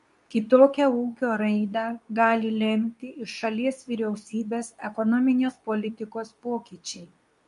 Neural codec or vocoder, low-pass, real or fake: codec, 24 kHz, 0.9 kbps, WavTokenizer, medium speech release version 2; 10.8 kHz; fake